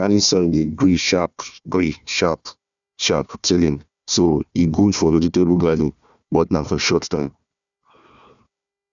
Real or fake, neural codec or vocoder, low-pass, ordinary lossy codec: fake; codec, 16 kHz, 1 kbps, FunCodec, trained on Chinese and English, 50 frames a second; 7.2 kHz; none